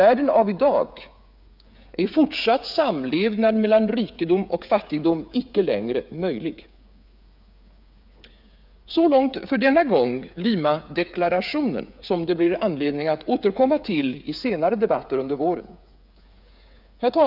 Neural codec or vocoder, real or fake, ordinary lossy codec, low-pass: codec, 16 kHz, 8 kbps, FreqCodec, smaller model; fake; none; 5.4 kHz